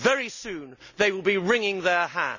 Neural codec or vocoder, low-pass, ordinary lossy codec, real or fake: none; 7.2 kHz; none; real